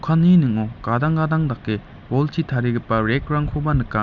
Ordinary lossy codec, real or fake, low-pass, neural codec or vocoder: none; real; 7.2 kHz; none